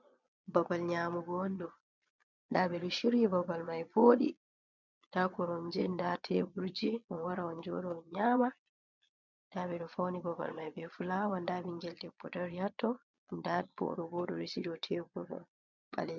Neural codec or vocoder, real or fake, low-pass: vocoder, 22.05 kHz, 80 mel bands, Vocos; fake; 7.2 kHz